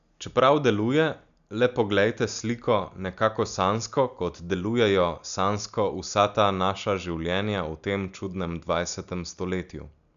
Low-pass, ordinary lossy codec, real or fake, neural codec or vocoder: 7.2 kHz; none; real; none